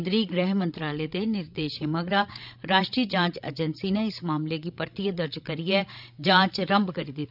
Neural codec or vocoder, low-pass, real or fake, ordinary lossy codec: codec, 16 kHz, 16 kbps, FreqCodec, larger model; 5.4 kHz; fake; none